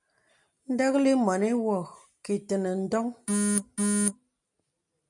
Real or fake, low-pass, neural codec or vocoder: real; 10.8 kHz; none